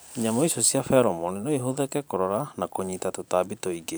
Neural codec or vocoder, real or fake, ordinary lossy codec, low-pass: vocoder, 44.1 kHz, 128 mel bands every 512 samples, BigVGAN v2; fake; none; none